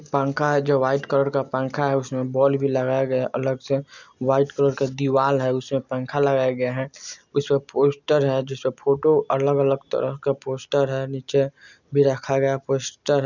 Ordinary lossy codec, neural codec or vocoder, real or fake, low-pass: none; none; real; 7.2 kHz